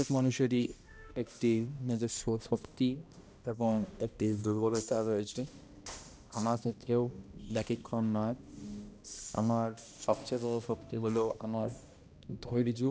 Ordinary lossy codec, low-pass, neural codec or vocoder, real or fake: none; none; codec, 16 kHz, 1 kbps, X-Codec, HuBERT features, trained on balanced general audio; fake